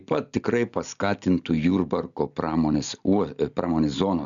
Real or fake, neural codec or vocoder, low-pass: real; none; 7.2 kHz